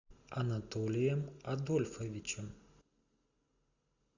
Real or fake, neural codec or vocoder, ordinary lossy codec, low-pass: real; none; Opus, 64 kbps; 7.2 kHz